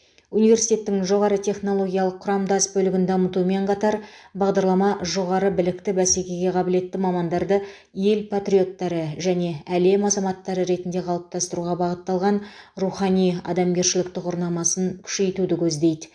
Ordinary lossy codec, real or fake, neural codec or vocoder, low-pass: AAC, 64 kbps; real; none; 9.9 kHz